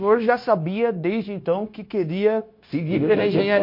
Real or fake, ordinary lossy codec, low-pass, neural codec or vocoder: fake; MP3, 32 kbps; 5.4 kHz; codec, 16 kHz, 0.9 kbps, LongCat-Audio-Codec